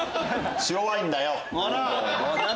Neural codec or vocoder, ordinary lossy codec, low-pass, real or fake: none; none; none; real